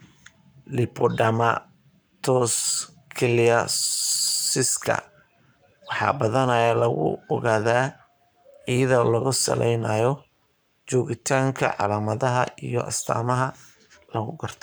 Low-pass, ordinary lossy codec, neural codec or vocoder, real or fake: none; none; codec, 44.1 kHz, 7.8 kbps, Pupu-Codec; fake